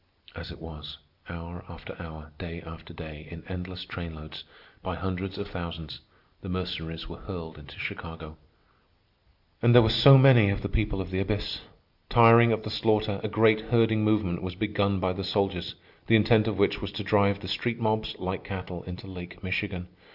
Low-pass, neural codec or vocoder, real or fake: 5.4 kHz; none; real